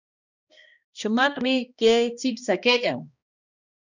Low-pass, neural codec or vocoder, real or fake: 7.2 kHz; codec, 16 kHz, 1 kbps, X-Codec, HuBERT features, trained on balanced general audio; fake